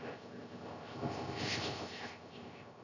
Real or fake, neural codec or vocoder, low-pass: fake; codec, 16 kHz, 0.3 kbps, FocalCodec; 7.2 kHz